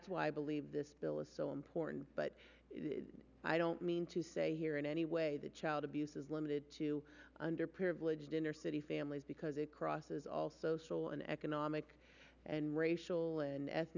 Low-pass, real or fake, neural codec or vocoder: 7.2 kHz; real; none